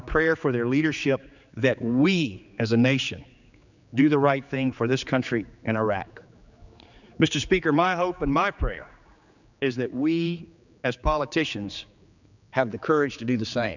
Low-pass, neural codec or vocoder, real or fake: 7.2 kHz; codec, 16 kHz, 4 kbps, X-Codec, HuBERT features, trained on general audio; fake